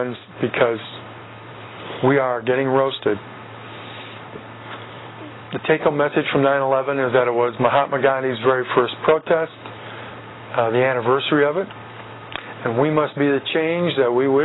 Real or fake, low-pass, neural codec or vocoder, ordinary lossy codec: real; 7.2 kHz; none; AAC, 16 kbps